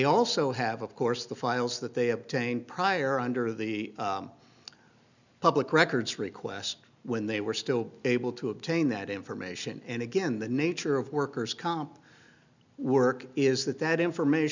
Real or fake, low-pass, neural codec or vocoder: real; 7.2 kHz; none